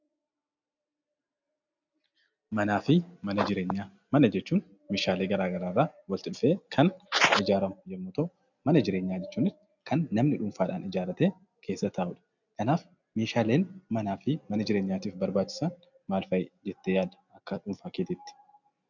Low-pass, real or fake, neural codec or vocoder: 7.2 kHz; real; none